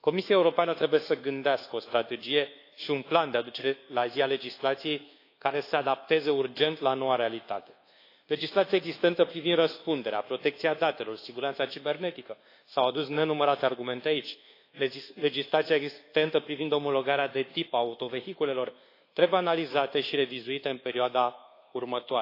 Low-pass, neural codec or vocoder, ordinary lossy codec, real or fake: 5.4 kHz; codec, 24 kHz, 1.2 kbps, DualCodec; AAC, 32 kbps; fake